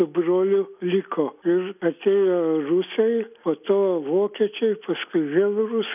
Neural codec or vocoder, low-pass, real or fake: none; 3.6 kHz; real